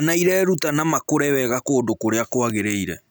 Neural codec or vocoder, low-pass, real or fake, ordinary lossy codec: none; none; real; none